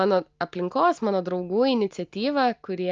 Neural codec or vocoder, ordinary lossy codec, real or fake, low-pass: none; Opus, 24 kbps; real; 7.2 kHz